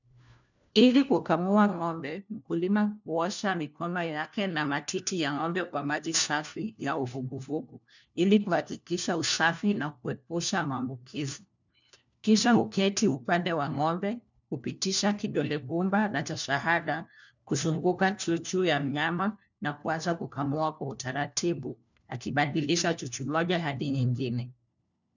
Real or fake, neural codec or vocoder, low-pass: fake; codec, 16 kHz, 1 kbps, FunCodec, trained on LibriTTS, 50 frames a second; 7.2 kHz